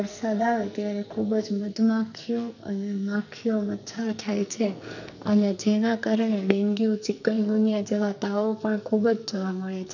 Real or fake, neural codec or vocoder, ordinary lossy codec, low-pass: fake; codec, 44.1 kHz, 2.6 kbps, SNAC; none; 7.2 kHz